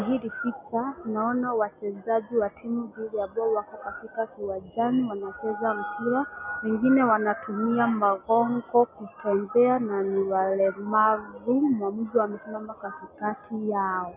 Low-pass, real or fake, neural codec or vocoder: 3.6 kHz; real; none